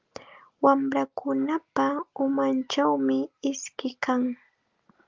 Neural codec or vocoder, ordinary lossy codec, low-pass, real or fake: none; Opus, 32 kbps; 7.2 kHz; real